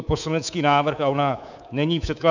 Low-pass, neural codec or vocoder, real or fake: 7.2 kHz; codec, 24 kHz, 3.1 kbps, DualCodec; fake